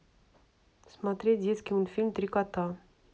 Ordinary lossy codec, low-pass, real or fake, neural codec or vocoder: none; none; real; none